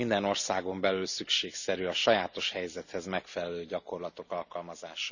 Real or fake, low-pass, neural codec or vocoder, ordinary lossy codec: real; 7.2 kHz; none; none